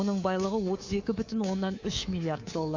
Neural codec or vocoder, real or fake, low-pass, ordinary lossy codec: codec, 24 kHz, 3.1 kbps, DualCodec; fake; 7.2 kHz; AAC, 48 kbps